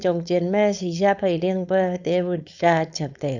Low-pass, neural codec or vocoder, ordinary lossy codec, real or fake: 7.2 kHz; codec, 16 kHz, 4.8 kbps, FACodec; none; fake